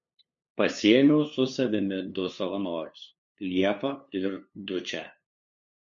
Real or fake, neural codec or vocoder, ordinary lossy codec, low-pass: fake; codec, 16 kHz, 2 kbps, FunCodec, trained on LibriTTS, 25 frames a second; MP3, 48 kbps; 7.2 kHz